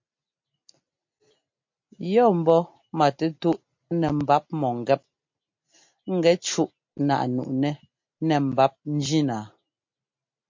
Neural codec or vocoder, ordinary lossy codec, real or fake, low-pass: none; MP3, 48 kbps; real; 7.2 kHz